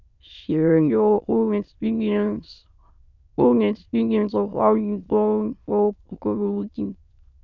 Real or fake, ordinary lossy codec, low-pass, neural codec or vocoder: fake; none; 7.2 kHz; autoencoder, 22.05 kHz, a latent of 192 numbers a frame, VITS, trained on many speakers